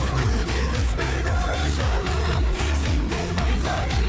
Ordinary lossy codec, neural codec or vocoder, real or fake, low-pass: none; codec, 16 kHz, 4 kbps, FreqCodec, larger model; fake; none